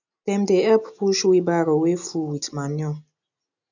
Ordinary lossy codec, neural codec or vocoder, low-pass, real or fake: none; none; 7.2 kHz; real